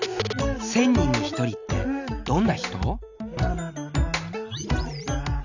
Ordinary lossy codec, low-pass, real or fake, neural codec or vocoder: none; 7.2 kHz; real; none